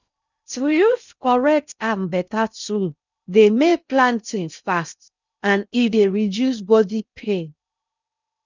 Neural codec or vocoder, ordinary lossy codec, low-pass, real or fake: codec, 16 kHz in and 24 kHz out, 0.6 kbps, FocalCodec, streaming, 2048 codes; none; 7.2 kHz; fake